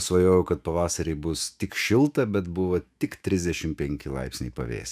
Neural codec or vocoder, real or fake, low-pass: autoencoder, 48 kHz, 128 numbers a frame, DAC-VAE, trained on Japanese speech; fake; 14.4 kHz